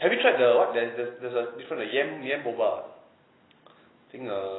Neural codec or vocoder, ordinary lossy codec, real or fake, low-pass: none; AAC, 16 kbps; real; 7.2 kHz